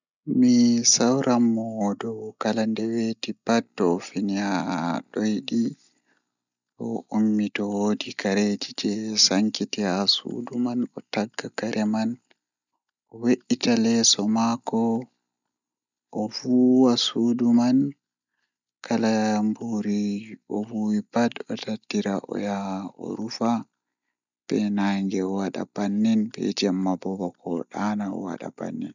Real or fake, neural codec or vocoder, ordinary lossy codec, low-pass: real; none; none; 7.2 kHz